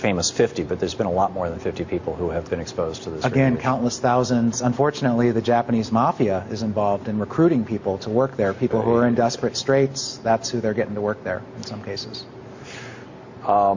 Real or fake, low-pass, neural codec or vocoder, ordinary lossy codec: real; 7.2 kHz; none; Opus, 64 kbps